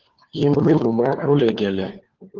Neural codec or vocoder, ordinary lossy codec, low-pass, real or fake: codec, 16 kHz, 4 kbps, FunCodec, trained on LibriTTS, 50 frames a second; Opus, 16 kbps; 7.2 kHz; fake